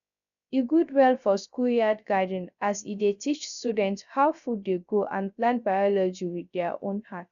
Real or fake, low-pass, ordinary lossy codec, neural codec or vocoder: fake; 7.2 kHz; none; codec, 16 kHz, 0.3 kbps, FocalCodec